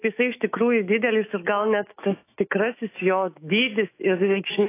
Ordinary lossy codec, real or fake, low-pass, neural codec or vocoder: AAC, 24 kbps; fake; 3.6 kHz; autoencoder, 48 kHz, 128 numbers a frame, DAC-VAE, trained on Japanese speech